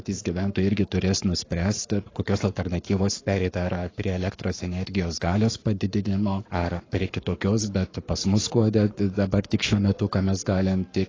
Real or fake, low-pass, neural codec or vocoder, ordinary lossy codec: fake; 7.2 kHz; codec, 44.1 kHz, 7.8 kbps, Pupu-Codec; AAC, 32 kbps